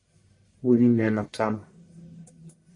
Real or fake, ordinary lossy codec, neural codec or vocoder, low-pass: fake; MP3, 48 kbps; codec, 44.1 kHz, 1.7 kbps, Pupu-Codec; 10.8 kHz